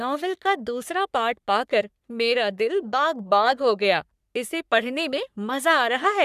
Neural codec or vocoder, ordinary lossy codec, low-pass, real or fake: codec, 44.1 kHz, 3.4 kbps, Pupu-Codec; none; 14.4 kHz; fake